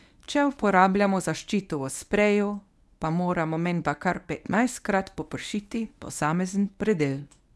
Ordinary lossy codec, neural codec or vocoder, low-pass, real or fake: none; codec, 24 kHz, 0.9 kbps, WavTokenizer, medium speech release version 1; none; fake